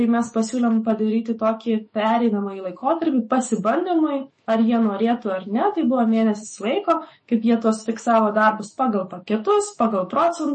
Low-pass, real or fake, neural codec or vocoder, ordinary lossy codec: 10.8 kHz; fake; autoencoder, 48 kHz, 128 numbers a frame, DAC-VAE, trained on Japanese speech; MP3, 32 kbps